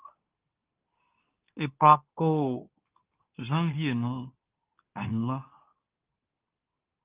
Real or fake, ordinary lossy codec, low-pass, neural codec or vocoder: fake; Opus, 24 kbps; 3.6 kHz; codec, 24 kHz, 0.9 kbps, WavTokenizer, medium speech release version 2